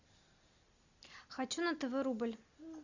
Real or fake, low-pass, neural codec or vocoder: real; 7.2 kHz; none